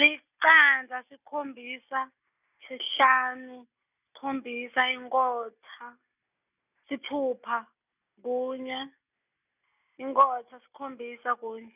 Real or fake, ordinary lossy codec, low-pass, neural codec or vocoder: real; none; 3.6 kHz; none